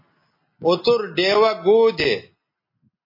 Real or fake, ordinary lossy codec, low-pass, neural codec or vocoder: real; MP3, 24 kbps; 5.4 kHz; none